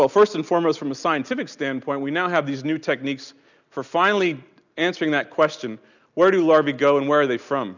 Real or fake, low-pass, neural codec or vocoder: real; 7.2 kHz; none